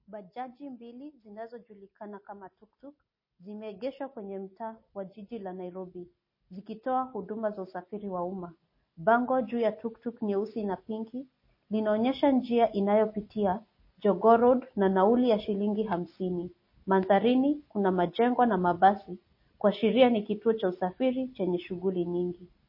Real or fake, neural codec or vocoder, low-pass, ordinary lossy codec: real; none; 5.4 kHz; MP3, 24 kbps